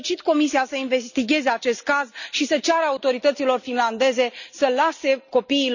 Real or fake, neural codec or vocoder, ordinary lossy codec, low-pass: real; none; none; 7.2 kHz